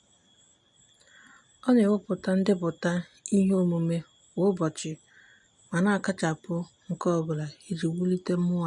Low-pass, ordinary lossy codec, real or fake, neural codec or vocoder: 10.8 kHz; none; real; none